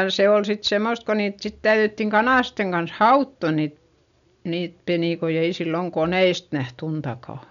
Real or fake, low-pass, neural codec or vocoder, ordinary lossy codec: real; 7.2 kHz; none; none